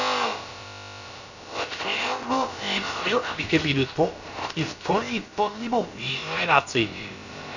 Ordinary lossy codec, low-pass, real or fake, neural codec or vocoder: none; 7.2 kHz; fake; codec, 16 kHz, about 1 kbps, DyCAST, with the encoder's durations